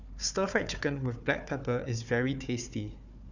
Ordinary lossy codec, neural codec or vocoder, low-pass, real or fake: none; codec, 16 kHz, 4 kbps, FunCodec, trained on Chinese and English, 50 frames a second; 7.2 kHz; fake